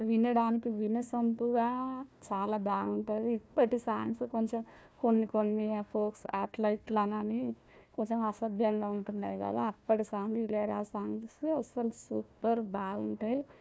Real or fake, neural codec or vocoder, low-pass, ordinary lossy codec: fake; codec, 16 kHz, 1 kbps, FunCodec, trained on Chinese and English, 50 frames a second; none; none